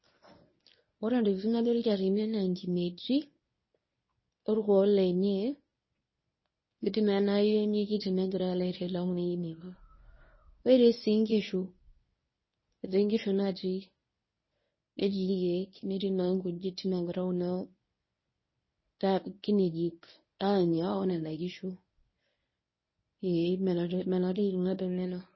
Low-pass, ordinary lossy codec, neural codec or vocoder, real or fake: 7.2 kHz; MP3, 24 kbps; codec, 24 kHz, 0.9 kbps, WavTokenizer, medium speech release version 1; fake